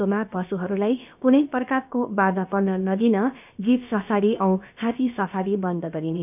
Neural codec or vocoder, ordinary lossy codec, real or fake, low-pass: codec, 16 kHz, about 1 kbps, DyCAST, with the encoder's durations; none; fake; 3.6 kHz